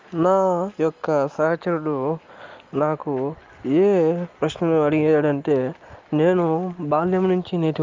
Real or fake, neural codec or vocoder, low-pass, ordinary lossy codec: real; none; 7.2 kHz; Opus, 32 kbps